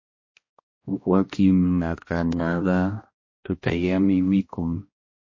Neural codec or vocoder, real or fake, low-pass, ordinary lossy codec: codec, 16 kHz, 1 kbps, X-Codec, HuBERT features, trained on balanced general audio; fake; 7.2 kHz; MP3, 32 kbps